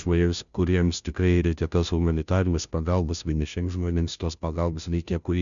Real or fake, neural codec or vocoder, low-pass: fake; codec, 16 kHz, 0.5 kbps, FunCodec, trained on Chinese and English, 25 frames a second; 7.2 kHz